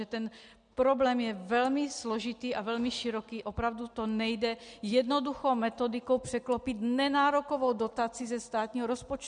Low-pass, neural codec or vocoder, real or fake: 9.9 kHz; none; real